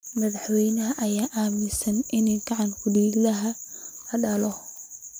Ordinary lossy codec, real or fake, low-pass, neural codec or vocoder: none; real; none; none